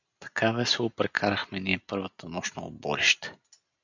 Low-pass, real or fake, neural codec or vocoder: 7.2 kHz; real; none